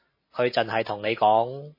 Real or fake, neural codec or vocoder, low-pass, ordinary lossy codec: real; none; 5.4 kHz; MP3, 24 kbps